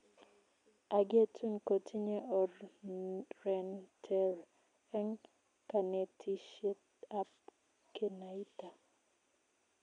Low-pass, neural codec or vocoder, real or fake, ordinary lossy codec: 9.9 kHz; none; real; none